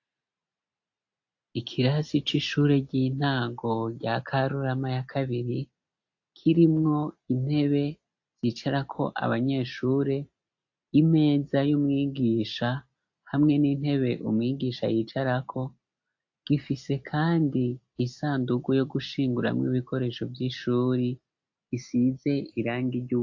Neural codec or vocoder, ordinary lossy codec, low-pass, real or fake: none; AAC, 48 kbps; 7.2 kHz; real